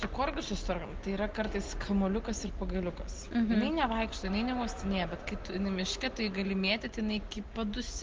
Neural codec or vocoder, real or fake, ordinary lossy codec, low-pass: none; real; Opus, 16 kbps; 7.2 kHz